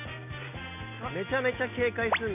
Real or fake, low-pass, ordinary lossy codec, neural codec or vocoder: real; 3.6 kHz; none; none